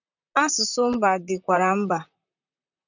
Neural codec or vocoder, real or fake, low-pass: vocoder, 44.1 kHz, 128 mel bands, Pupu-Vocoder; fake; 7.2 kHz